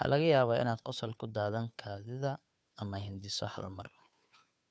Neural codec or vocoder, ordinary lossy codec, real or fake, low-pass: codec, 16 kHz, 2 kbps, FunCodec, trained on Chinese and English, 25 frames a second; none; fake; none